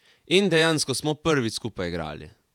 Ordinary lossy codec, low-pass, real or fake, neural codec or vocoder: none; 19.8 kHz; fake; vocoder, 48 kHz, 128 mel bands, Vocos